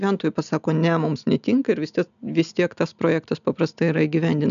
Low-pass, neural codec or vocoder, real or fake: 7.2 kHz; none; real